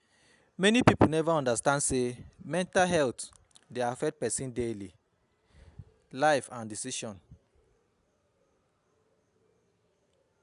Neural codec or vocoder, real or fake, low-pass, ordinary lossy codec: none; real; 10.8 kHz; none